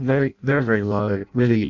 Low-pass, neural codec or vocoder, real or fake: 7.2 kHz; codec, 16 kHz in and 24 kHz out, 0.6 kbps, FireRedTTS-2 codec; fake